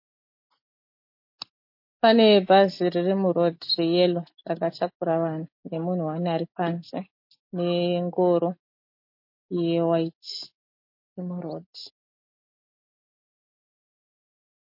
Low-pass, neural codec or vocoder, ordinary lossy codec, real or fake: 5.4 kHz; none; MP3, 32 kbps; real